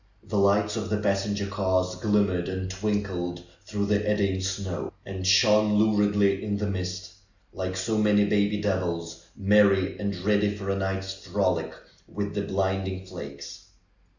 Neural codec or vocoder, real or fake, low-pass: none; real; 7.2 kHz